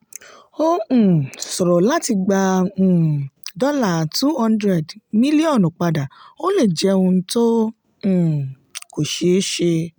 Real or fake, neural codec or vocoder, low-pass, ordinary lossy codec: real; none; none; none